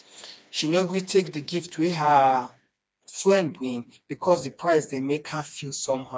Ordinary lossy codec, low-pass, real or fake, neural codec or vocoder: none; none; fake; codec, 16 kHz, 2 kbps, FreqCodec, smaller model